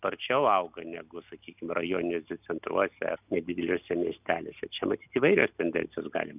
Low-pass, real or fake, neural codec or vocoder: 3.6 kHz; real; none